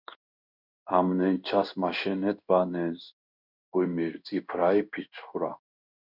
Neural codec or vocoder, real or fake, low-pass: codec, 16 kHz in and 24 kHz out, 1 kbps, XY-Tokenizer; fake; 5.4 kHz